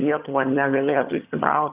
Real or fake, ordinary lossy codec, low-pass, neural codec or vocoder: fake; Opus, 64 kbps; 3.6 kHz; vocoder, 22.05 kHz, 80 mel bands, HiFi-GAN